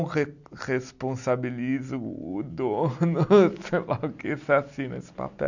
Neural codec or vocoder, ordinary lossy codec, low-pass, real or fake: none; none; 7.2 kHz; real